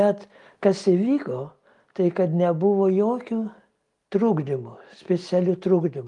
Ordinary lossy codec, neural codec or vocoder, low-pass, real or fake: Opus, 32 kbps; none; 10.8 kHz; real